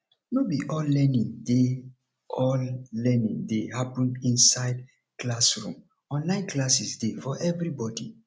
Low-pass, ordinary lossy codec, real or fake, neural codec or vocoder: none; none; real; none